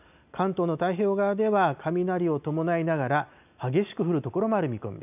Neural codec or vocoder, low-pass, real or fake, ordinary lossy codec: none; 3.6 kHz; real; none